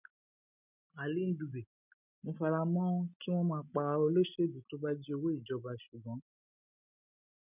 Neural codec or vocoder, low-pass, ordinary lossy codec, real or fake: none; 3.6 kHz; none; real